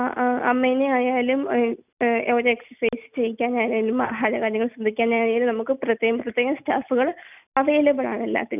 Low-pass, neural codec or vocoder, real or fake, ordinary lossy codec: 3.6 kHz; none; real; none